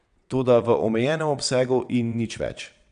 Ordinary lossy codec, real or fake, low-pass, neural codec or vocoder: none; fake; 9.9 kHz; vocoder, 22.05 kHz, 80 mel bands, WaveNeXt